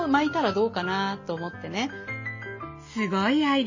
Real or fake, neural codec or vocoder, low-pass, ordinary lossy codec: real; none; 7.2 kHz; MP3, 32 kbps